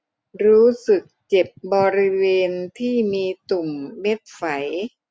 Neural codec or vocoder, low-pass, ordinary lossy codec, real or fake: none; none; none; real